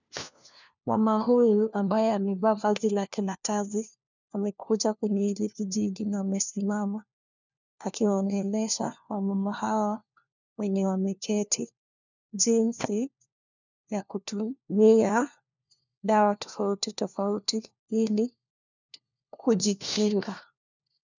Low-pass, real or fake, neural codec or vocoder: 7.2 kHz; fake; codec, 16 kHz, 1 kbps, FunCodec, trained on LibriTTS, 50 frames a second